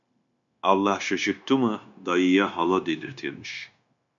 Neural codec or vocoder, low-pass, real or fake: codec, 16 kHz, 0.9 kbps, LongCat-Audio-Codec; 7.2 kHz; fake